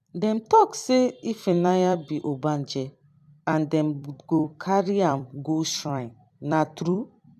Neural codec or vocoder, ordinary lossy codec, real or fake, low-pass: vocoder, 44.1 kHz, 128 mel bands every 256 samples, BigVGAN v2; AAC, 96 kbps; fake; 14.4 kHz